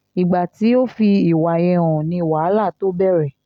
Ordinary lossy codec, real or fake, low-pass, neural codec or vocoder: none; real; 19.8 kHz; none